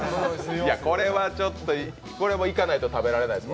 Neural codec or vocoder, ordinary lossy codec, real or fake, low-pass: none; none; real; none